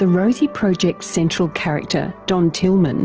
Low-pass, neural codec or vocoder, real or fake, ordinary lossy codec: 7.2 kHz; none; real; Opus, 16 kbps